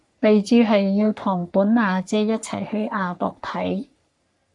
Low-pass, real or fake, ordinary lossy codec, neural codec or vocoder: 10.8 kHz; fake; AAC, 64 kbps; codec, 44.1 kHz, 3.4 kbps, Pupu-Codec